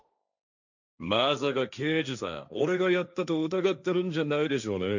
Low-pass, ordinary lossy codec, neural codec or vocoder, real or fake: 7.2 kHz; none; codec, 16 kHz, 1.1 kbps, Voila-Tokenizer; fake